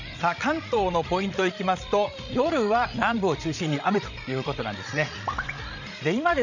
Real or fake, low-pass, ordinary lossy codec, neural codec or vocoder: fake; 7.2 kHz; none; codec, 16 kHz, 16 kbps, FreqCodec, larger model